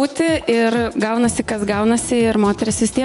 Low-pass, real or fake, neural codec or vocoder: 10.8 kHz; fake; vocoder, 24 kHz, 100 mel bands, Vocos